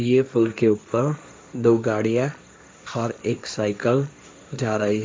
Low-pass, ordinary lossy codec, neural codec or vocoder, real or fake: none; none; codec, 16 kHz, 1.1 kbps, Voila-Tokenizer; fake